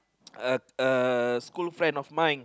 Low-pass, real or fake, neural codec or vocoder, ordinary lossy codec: none; real; none; none